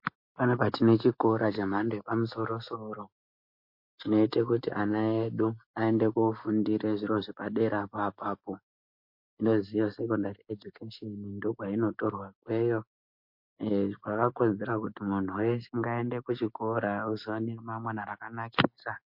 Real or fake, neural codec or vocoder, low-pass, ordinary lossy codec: real; none; 5.4 kHz; MP3, 32 kbps